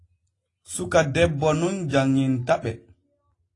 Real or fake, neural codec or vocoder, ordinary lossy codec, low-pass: real; none; AAC, 32 kbps; 10.8 kHz